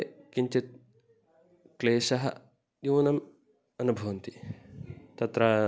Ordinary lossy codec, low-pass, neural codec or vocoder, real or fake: none; none; none; real